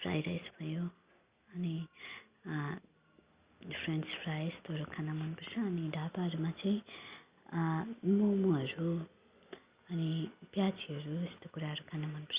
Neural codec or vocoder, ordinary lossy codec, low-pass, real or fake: none; Opus, 64 kbps; 3.6 kHz; real